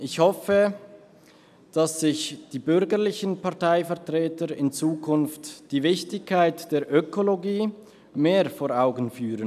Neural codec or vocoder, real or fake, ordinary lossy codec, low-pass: none; real; none; 14.4 kHz